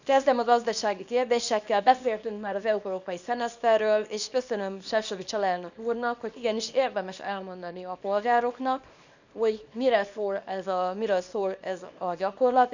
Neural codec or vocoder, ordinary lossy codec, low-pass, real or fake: codec, 24 kHz, 0.9 kbps, WavTokenizer, small release; none; 7.2 kHz; fake